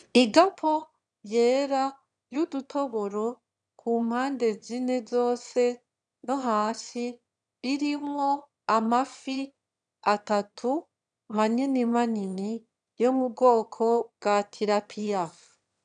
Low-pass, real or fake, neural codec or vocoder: 9.9 kHz; fake; autoencoder, 22.05 kHz, a latent of 192 numbers a frame, VITS, trained on one speaker